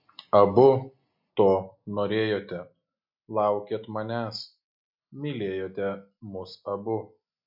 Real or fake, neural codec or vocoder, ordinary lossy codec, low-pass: real; none; MP3, 48 kbps; 5.4 kHz